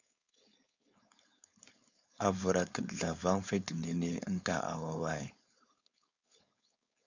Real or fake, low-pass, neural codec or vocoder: fake; 7.2 kHz; codec, 16 kHz, 4.8 kbps, FACodec